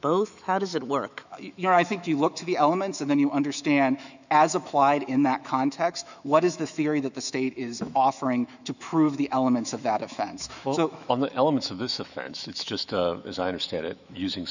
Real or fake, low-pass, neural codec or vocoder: fake; 7.2 kHz; autoencoder, 48 kHz, 128 numbers a frame, DAC-VAE, trained on Japanese speech